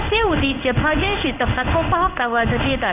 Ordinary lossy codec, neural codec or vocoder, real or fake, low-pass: none; codec, 16 kHz in and 24 kHz out, 1 kbps, XY-Tokenizer; fake; 3.6 kHz